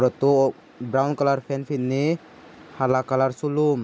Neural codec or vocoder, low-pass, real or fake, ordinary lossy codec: none; none; real; none